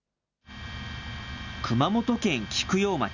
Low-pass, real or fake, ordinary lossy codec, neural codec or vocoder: 7.2 kHz; real; none; none